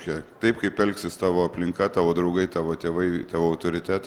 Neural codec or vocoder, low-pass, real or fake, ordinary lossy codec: none; 19.8 kHz; real; Opus, 24 kbps